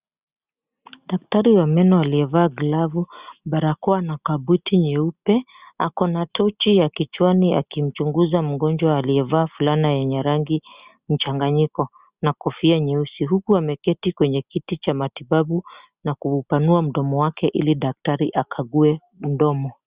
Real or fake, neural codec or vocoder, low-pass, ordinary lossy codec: real; none; 3.6 kHz; Opus, 64 kbps